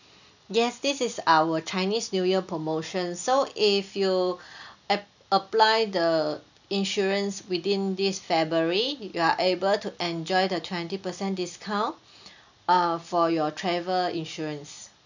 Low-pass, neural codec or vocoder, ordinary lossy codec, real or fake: 7.2 kHz; none; none; real